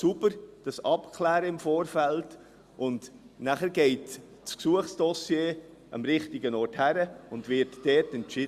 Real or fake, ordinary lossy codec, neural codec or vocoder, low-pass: real; Opus, 64 kbps; none; 14.4 kHz